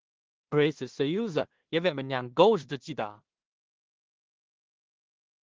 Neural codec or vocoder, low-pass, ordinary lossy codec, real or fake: codec, 16 kHz in and 24 kHz out, 0.4 kbps, LongCat-Audio-Codec, two codebook decoder; 7.2 kHz; Opus, 16 kbps; fake